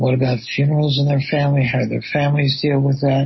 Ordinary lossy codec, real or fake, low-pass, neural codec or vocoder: MP3, 24 kbps; real; 7.2 kHz; none